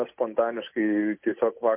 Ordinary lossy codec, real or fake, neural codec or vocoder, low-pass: MP3, 24 kbps; real; none; 3.6 kHz